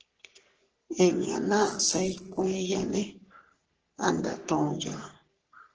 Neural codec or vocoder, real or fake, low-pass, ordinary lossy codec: codec, 44.1 kHz, 3.4 kbps, Pupu-Codec; fake; 7.2 kHz; Opus, 16 kbps